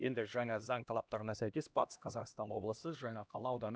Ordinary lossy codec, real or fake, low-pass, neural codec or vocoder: none; fake; none; codec, 16 kHz, 1 kbps, X-Codec, HuBERT features, trained on LibriSpeech